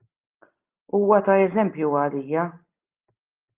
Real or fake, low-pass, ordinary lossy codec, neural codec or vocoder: real; 3.6 kHz; Opus, 24 kbps; none